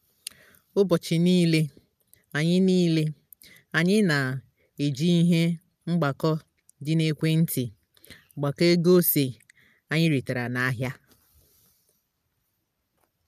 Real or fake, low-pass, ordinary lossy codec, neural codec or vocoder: real; 14.4 kHz; none; none